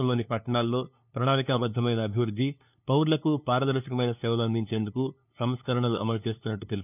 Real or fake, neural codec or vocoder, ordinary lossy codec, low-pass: fake; codec, 16 kHz, 2 kbps, FunCodec, trained on LibriTTS, 25 frames a second; none; 3.6 kHz